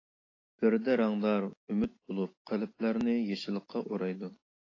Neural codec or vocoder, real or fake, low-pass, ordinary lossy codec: none; real; 7.2 kHz; AAC, 32 kbps